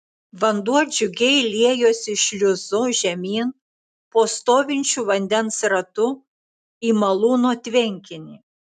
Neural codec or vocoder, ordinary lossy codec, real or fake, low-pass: none; AAC, 96 kbps; real; 14.4 kHz